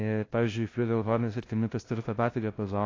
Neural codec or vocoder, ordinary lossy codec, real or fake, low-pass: codec, 16 kHz, 0.5 kbps, FunCodec, trained on LibriTTS, 25 frames a second; AAC, 32 kbps; fake; 7.2 kHz